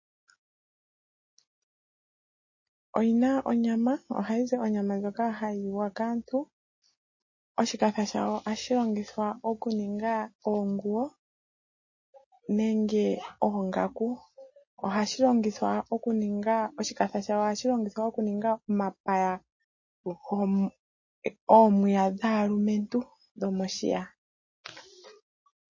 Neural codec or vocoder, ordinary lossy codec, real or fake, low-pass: none; MP3, 32 kbps; real; 7.2 kHz